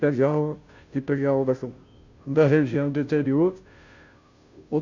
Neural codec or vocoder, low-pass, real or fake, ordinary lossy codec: codec, 16 kHz, 0.5 kbps, FunCodec, trained on Chinese and English, 25 frames a second; 7.2 kHz; fake; none